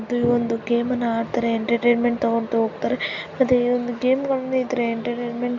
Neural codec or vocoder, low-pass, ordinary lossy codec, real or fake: none; 7.2 kHz; none; real